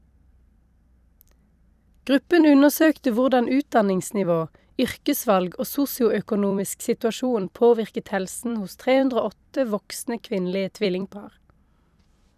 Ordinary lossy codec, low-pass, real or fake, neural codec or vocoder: none; 14.4 kHz; fake; vocoder, 44.1 kHz, 128 mel bands every 256 samples, BigVGAN v2